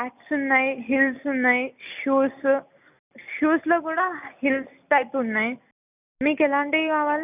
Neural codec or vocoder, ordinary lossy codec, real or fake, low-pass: none; none; real; 3.6 kHz